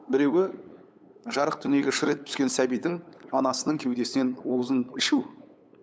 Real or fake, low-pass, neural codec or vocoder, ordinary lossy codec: fake; none; codec, 16 kHz, 8 kbps, FunCodec, trained on LibriTTS, 25 frames a second; none